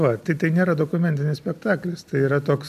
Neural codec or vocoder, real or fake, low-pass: none; real; 14.4 kHz